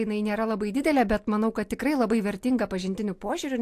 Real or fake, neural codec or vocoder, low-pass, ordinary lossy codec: real; none; 14.4 kHz; AAC, 64 kbps